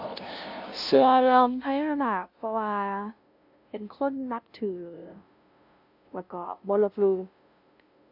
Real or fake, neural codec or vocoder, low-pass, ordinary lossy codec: fake; codec, 16 kHz, 0.5 kbps, FunCodec, trained on LibriTTS, 25 frames a second; 5.4 kHz; none